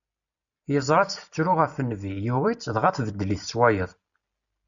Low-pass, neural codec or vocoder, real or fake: 7.2 kHz; none; real